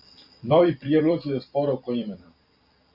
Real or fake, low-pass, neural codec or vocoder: fake; 5.4 kHz; vocoder, 44.1 kHz, 128 mel bands every 256 samples, BigVGAN v2